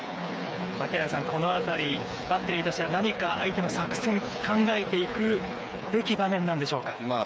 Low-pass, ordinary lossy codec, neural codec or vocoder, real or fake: none; none; codec, 16 kHz, 4 kbps, FreqCodec, smaller model; fake